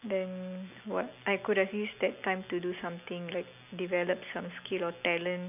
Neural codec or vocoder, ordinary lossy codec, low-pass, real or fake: none; none; 3.6 kHz; real